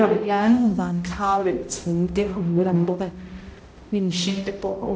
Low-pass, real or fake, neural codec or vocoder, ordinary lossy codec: none; fake; codec, 16 kHz, 0.5 kbps, X-Codec, HuBERT features, trained on balanced general audio; none